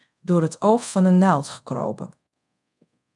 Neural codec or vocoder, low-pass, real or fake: codec, 24 kHz, 0.5 kbps, DualCodec; 10.8 kHz; fake